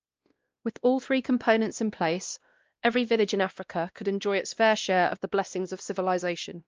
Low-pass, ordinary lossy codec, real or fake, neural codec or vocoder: 7.2 kHz; Opus, 24 kbps; fake; codec, 16 kHz, 1 kbps, X-Codec, WavLM features, trained on Multilingual LibriSpeech